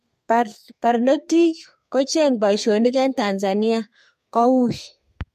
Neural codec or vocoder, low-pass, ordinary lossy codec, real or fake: codec, 32 kHz, 1.9 kbps, SNAC; 14.4 kHz; MP3, 64 kbps; fake